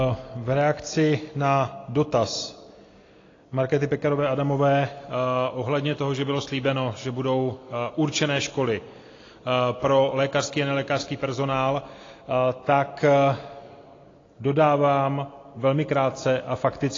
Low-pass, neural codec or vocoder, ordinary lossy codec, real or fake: 7.2 kHz; none; AAC, 32 kbps; real